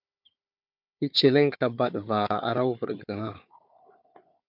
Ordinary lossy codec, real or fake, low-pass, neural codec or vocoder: MP3, 48 kbps; fake; 5.4 kHz; codec, 16 kHz, 4 kbps, FunCodec, trained on Chinese and English, 50 frames a second